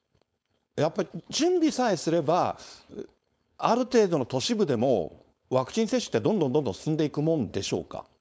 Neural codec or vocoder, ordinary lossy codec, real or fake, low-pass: codec, 16 kHz, 4.8 kbps, FACodec; none; fake; none